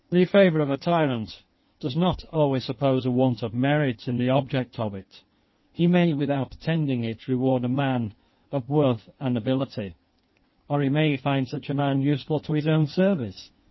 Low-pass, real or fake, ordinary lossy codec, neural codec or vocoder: 7.2 kHz; fake; MP3, 24 kbps; codec, 16 kHz in and 24 kHz out, 1.1 kbps, FireRedTTS-2 codec